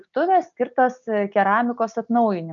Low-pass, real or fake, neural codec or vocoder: 7.2 kHz; real; none